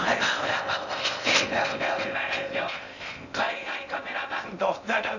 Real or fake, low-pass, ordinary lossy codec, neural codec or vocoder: fake; 7.2 kHz; none; codec, 16 kHz in and 24 kHz out, 0.6 kbps, FocalCodec, streaming, 4096 codes